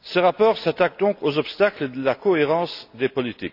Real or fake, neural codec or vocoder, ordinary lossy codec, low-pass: real; none; none; 5.4 kHz